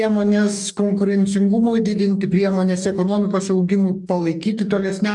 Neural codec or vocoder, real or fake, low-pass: codec, 44.1 kHz, 2.6 kbps, DAC; fake; 10.8 kHz